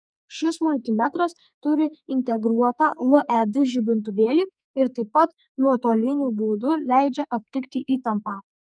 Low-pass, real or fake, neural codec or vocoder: 9.9 kHz; fake; codec, 44.1 kHz, 2.6 kbps, SNAC